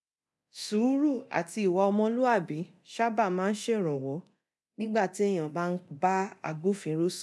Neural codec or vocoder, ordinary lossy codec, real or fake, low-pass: codec, 24 kHz, 0.9 kbps, DualCodec; none; fake; none